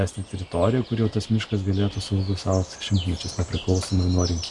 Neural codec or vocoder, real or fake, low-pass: none; real; 10.8 kHz